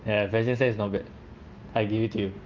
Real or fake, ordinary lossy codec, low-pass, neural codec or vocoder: real; Opus, 32 kbps; 7.2 kHz; none